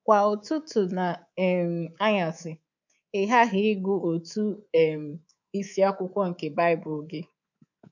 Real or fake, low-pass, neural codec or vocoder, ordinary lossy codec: fake; 7.2 kHz; codec, 24 kHz, 3.1 kbps, DualCodec; none